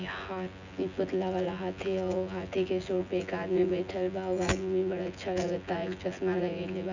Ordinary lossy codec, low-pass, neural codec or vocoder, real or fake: none; 7.2 kHz; vocoder, 24 kHz, 100 mel bands, Vocos; fake